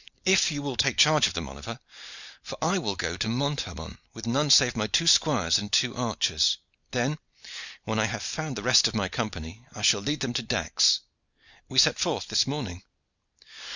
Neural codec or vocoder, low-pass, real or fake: none; 7.2 kHz; real